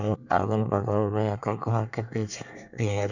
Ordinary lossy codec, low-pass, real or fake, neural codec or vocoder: none; 7.2 kHz; fake; codec, 24 kHz, 1 kbps, SNAC